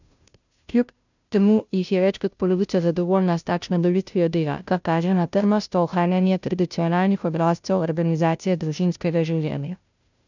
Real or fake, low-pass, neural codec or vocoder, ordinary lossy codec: fake; 7.2 kHz; codec, 16 kHz, 0.5 kbps, FunCodec, trained on Chinese and English, 25 frames a second; none